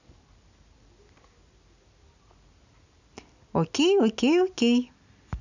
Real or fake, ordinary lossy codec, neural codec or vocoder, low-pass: real; none; none; 7.2 kHz